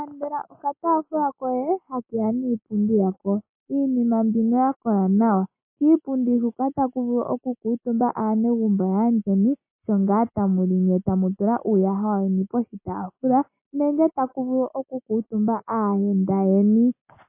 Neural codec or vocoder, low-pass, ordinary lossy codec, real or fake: none; 3.6 kHz; MP3, 24 kbps; real